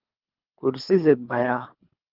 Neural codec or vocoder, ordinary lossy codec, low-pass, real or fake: codec, 24 kHz, 3 kbps, HILCodec; Opus, 32 kbps; 5.4 kHz; fake